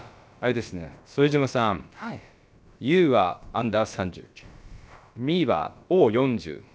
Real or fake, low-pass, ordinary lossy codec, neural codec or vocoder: fake; none; none; codec, 16 kHz, about 1 kbps, DyCAST, with the encoder's durations